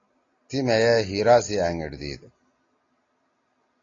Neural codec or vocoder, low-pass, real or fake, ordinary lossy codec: none; 7.2 kHz; real; AAC, 32 kbps